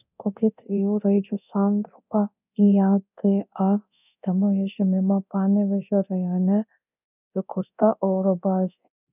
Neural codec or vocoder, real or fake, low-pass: codec, 24 kHz, 0.9 kbps, DualCodec; fake; 3.6 kHz